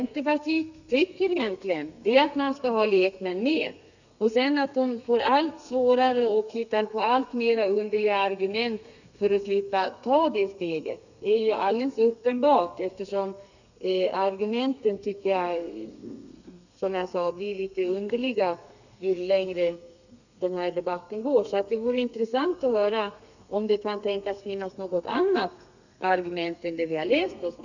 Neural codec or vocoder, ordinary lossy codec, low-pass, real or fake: codec, 32 kHz, 1.9 kbps, SNAC; none; 7.2 kHz; fake